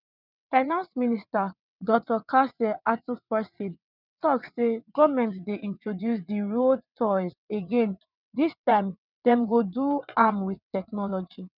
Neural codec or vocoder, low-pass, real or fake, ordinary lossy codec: vocoder, 22.05 kHz, 80 mel bands, WaveNeXt; 5.4 kHz; fake; none